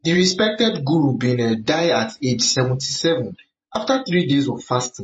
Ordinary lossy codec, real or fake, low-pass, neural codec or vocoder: MP3, 32 kbps; fake; 10.8 kHz; vocoder, 48 kHz, 128 mel bands, Vocos